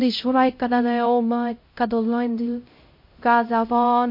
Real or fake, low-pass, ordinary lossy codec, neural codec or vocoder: fake; 5.4 kHz; MP3, 32 kbps; codec, 16 kHz, 0.5 kbps, X-Codec, HuBERT features, trained on LibriSpeech